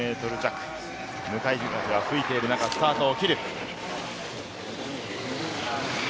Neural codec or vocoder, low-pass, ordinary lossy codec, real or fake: none; none; none; real